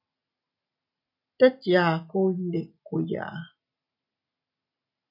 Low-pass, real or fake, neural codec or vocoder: 5.4 kHz; real; none